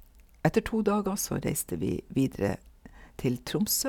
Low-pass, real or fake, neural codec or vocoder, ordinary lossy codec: 19.8 kHz; real; none; none